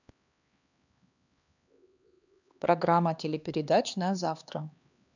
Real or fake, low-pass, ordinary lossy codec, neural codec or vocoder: fake; 7.2 kHz; none; codec, 16 kHz, 2 kbps, X-Codec, HuBERT features, trained on LibriSpeech